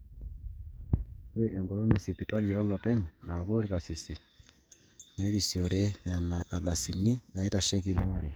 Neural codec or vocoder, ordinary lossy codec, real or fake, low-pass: codec, 44.1 kHz, 2.6 kbps, SNAC; none; fake; none